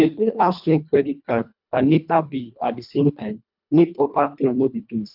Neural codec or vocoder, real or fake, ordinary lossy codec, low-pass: codec, 24 kHz, 1.5 kbps, HILCodec; fake; none; 5.4 kHz